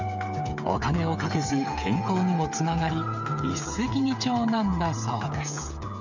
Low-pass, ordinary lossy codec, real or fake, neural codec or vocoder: 7.2 kHz; none; fake; codec, 16 kHz, 8 kbps, FreqCodec, smaller model